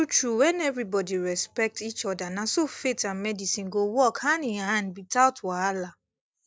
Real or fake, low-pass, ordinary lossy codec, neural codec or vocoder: real; none; none; none